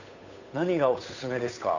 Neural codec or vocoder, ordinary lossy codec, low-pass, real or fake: codec, 16 kHz, 8 kbps, FunCodec, trained on Chinese and English, 25 frames a second; none; 7.2 kHz; fake